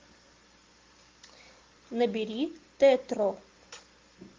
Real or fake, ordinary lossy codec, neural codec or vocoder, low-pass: real; Opus, 32 kbps; none; 7.2 kHz